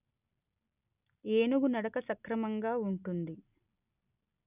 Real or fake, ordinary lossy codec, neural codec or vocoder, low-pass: real; none; none; 3.6 kHz